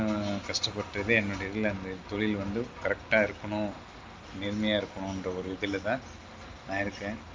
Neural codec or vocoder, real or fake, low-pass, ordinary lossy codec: none; real; 7.2 kHz; Opus, 32 kbps